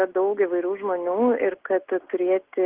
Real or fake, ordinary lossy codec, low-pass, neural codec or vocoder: real; Opus, 16 kbps; 3.6 kHz; none